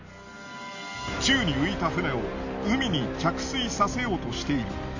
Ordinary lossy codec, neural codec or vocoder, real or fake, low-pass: none; none; real; 7.2 kHz